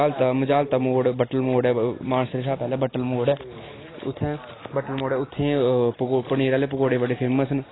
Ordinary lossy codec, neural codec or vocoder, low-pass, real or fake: AAC, 16 kbps; none; 7.2 kHz; real